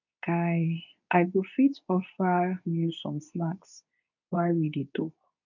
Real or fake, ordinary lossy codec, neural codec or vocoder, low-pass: fake; none; codec, 24 kHz, 0.9 kbps, WavTokenizer, medium speech release version 2; 7.2 kHz